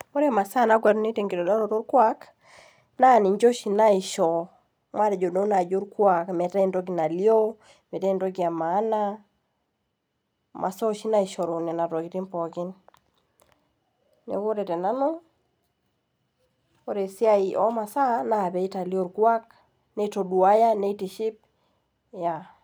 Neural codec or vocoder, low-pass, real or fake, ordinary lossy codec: none; none; real; none